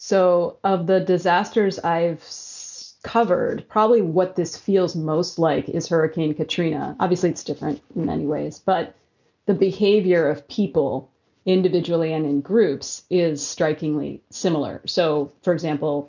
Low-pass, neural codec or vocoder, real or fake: 7.2 kHz; none; real